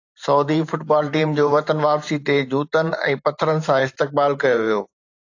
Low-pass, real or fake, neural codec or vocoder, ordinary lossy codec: 7.2 kHz; fake; vocoder, 44.1 kHz, 128 mel bands every 512 samples, BigVGAN v2; AAC, 48 kbps